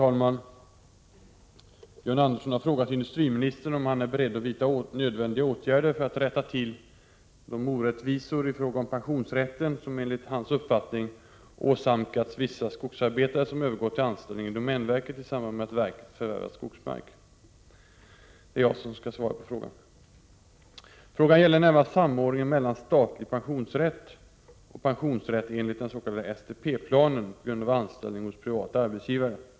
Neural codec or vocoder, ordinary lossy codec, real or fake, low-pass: none; none; real; none